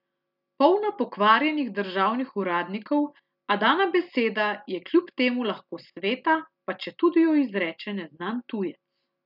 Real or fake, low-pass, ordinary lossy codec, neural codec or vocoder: real; 5.4 kHz; none; none